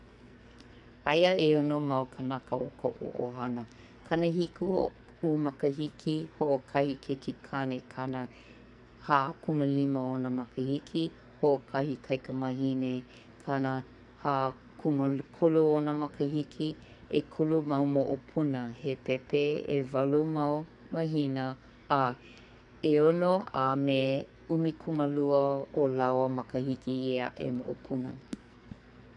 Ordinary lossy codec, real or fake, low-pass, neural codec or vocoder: none; fake; 10.8 kHz; codec, 44.1 kHz, 2.6 kbps, SNAC